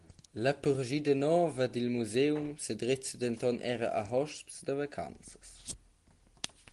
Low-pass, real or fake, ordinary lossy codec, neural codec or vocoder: 10.8 kHz; real; Opus, 24 kbps; none